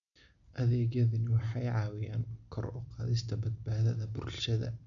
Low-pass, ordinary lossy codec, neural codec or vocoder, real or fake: 7.2 kHz; none; none; real